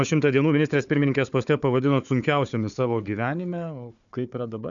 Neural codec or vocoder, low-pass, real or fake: codec, 16 kHz, 4 kbps, FunCodec, trained on Chinese and English, 50 frames a second; 7.2 kHz; fake